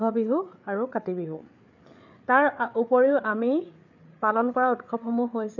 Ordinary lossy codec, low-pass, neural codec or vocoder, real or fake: none; 7.2 kHz; codec, 16 kHz, 8 kbps, FreqCodec, larger model; fake